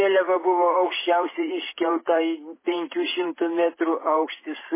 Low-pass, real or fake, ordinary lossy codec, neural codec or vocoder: 3.6 kHz; fake; MP3, 16 kbps; vocoder, 44.1 kHz, 128 mel bands every 512 samples, BigVGAN v2